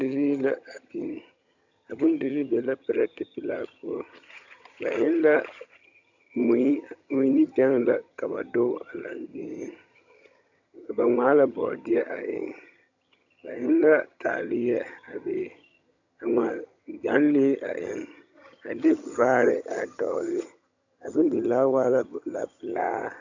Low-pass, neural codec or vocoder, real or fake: 7.2 kHz; vocoder, 22.05 kHz, 80 mel bands, HiFi-GAN; fake